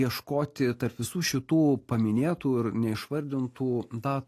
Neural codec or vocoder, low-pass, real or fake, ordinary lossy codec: none; 14.4 kHz; real; AAC, 48 kbps